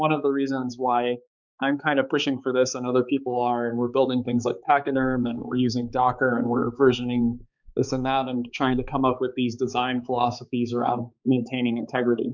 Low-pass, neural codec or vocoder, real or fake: 7.2 kHz; codec, 16 kHz, 4 kbps, X-Codec, HuBERT features, trained on balanced general audio; fake